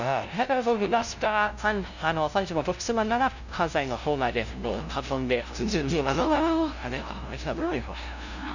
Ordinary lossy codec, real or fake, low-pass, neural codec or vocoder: none; fake; 7.2 kHz; codec, 16 kHz, 0.5 kbps, FunCodec, trained on LibriTTS, 25 frames a second